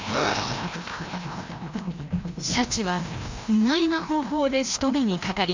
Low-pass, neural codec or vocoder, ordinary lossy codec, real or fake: 7.2 kHz; codec, 16 kHz, 1 kbps, FreqCodec, larger model; MP3, 64 kbps; fake